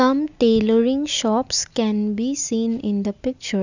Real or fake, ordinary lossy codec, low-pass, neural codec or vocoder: real; none; 7.2 kHz; none